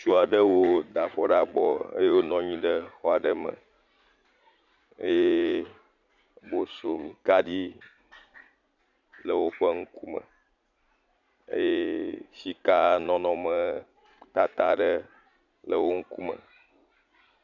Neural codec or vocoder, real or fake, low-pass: vocoder, 44.1 kHz, 80 mel bands, Vocos; fake; 7.2 kHz